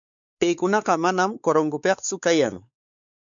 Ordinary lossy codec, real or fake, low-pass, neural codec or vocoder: MP3, 96 kbps; fake; 7.2 kHz; codec, 16 kHz, 4 kbps, X-Codec, WavLM features, trained on Multilingual LibriSpeech